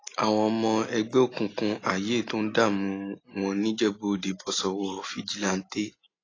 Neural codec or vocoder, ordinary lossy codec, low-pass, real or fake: none; AAC, 32 kbps; 7.2 kHz; real